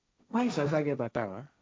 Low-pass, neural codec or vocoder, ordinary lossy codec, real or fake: none; codec, 16 kHz, 1.1 kbps, Voila-Tokenizer; none; fake